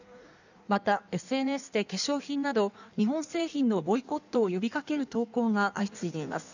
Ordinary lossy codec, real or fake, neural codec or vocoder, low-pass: none; fake; codec, 16 kHz in and 24 kHz out, 1.1 kbps, FireRedTTS-2 codec; 7.2 kHz